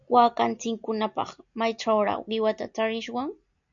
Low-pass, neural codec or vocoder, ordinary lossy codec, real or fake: 7.2 kHz; none; MP3, 64 kbps; real